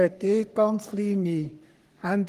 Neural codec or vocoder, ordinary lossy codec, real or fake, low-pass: codec, 44.1 kHz, 3.4 kbps, Pupu-Codec; Opus, 24 kbps; fake; 14.4 kHz